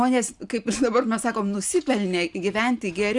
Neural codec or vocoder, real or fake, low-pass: none; real; 10.8 kHz